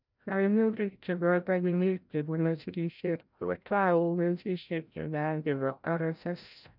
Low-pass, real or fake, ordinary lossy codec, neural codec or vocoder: 5.4 kHz; fake; none; codec, 16 kHz, 0.5 kbps, FreqCodec, larger model